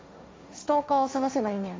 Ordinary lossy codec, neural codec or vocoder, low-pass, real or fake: none; codec, 16 kHz, 1.1 kbps, Voila-Tokenizer; none; fake